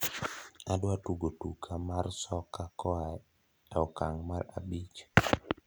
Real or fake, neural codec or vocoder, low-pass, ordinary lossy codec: real; none; none; none